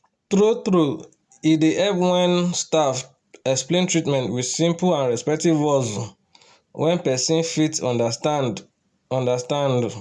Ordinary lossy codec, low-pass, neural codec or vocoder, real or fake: none; none; none; real